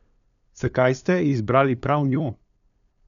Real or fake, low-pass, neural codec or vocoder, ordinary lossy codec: fake; 7.2 kHz; codec, 16 kHz, 2 kbps, FunCodec, trained on LibriTTS, 25 frames a second; none